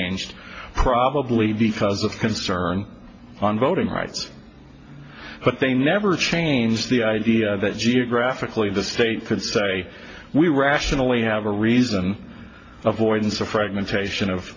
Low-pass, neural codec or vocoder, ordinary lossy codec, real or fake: 7.2 kHz; none; AAC, 32 kbps; real